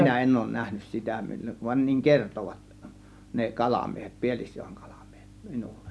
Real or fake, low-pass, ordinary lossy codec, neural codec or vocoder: real; none; none; none